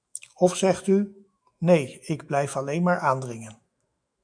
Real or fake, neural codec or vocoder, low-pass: fake; autoencoder, 48 kHz, 128 numbers a frame, DAC-VAE, trained on Japanese speech; 9.9 kHz